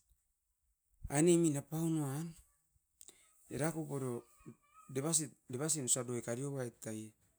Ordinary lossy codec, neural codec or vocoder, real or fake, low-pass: none; none; real; none